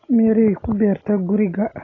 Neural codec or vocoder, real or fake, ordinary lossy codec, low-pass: none; real; AAC, 32 kbps; 7.2 kHz